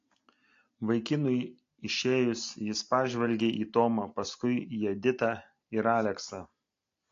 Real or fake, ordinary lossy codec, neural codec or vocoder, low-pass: real; AAC, 48 kbps; none; 7.2 kHz